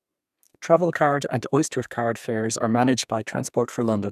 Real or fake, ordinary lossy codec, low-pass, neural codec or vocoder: fake; none; 14.4 kHz; codec, 32 kHz, 1.9 kbps, SNAC